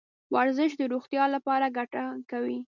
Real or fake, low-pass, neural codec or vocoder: real; 7.2 kHz; none